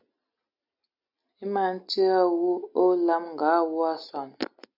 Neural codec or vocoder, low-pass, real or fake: none; 5.4 kHz; real